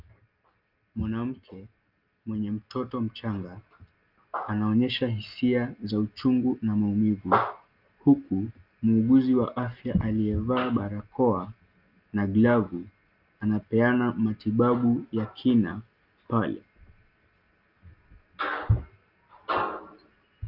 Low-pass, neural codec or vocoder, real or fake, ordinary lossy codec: 5.4 kHz; none; real; Opus, 24 kbps